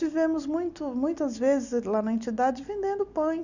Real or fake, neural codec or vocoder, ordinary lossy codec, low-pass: real; none; none; 7.2 kHz